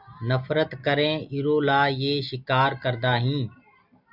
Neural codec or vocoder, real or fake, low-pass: none; real; 5.4 kHz